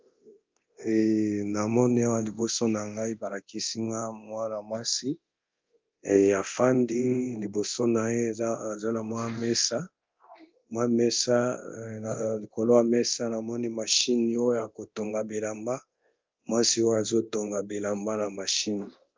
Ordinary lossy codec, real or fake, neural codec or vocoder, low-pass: Opus, 24 kbps; fake; codec, 24 kHz, 0.9 kbps, DualCodec; 7.2 kHz